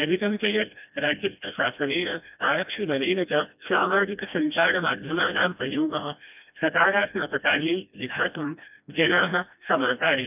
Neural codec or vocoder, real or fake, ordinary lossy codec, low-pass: codec, 16 kHz, 1 kbps, FreqCodec, smaller model; fake; none; 3.6 kHz